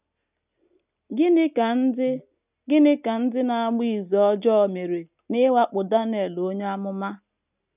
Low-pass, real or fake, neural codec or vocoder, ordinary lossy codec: 3.6 kHz; real; none; none